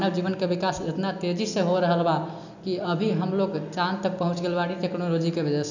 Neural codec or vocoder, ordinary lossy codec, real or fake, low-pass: none; none; real; 7.2 kHz